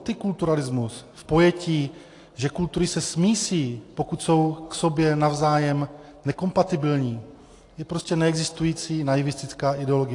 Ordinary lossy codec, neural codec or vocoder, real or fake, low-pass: AAC, 48 kbps; none; real; 10.8 kHz